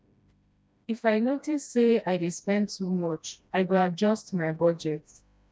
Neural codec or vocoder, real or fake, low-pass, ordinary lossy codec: codec, 16 kHz, 1 kbps, FreqCodec, smaller model; fake; none; none